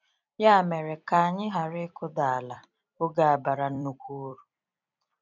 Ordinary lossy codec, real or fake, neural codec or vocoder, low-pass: none; fake; vocoder, 44.1 kHz, 128 mel bands every 256 samples, BigVGAN v2; 7.2 kHz